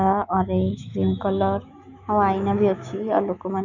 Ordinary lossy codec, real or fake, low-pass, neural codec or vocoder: none; fake; 7.2 kHz; autoencoder, 48 kHz, 128 numbers a frame, DAC-VAE, trained on Japanese speech